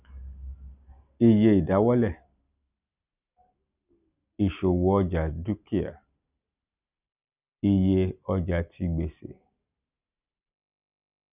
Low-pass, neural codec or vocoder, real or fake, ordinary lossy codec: 3.6 kHz; none; real; none